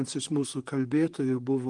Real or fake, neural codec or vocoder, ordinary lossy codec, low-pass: fake; vocoder, 44.1 kHz, 128 mel bands, Pupu-Vocoder; Opus, 24 kbps; 10.8 kHz